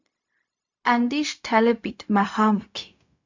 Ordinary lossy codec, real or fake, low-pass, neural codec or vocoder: MP3, 64 kbps; fake; 7.2 kHz; codec, 16 kHz, 0.4 kbps, LongCat-Audio-Codec